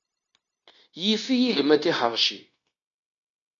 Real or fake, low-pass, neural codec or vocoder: fake; 7.2 kHz; codec, 16 kHz, 0.9 kbps, LongCat-Audio-Codec